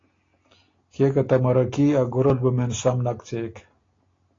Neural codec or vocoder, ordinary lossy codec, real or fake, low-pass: none; AAC, 32 kbps; real; 7.2 kHz